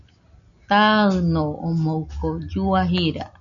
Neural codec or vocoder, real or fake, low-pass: none; real; 7.2 kHz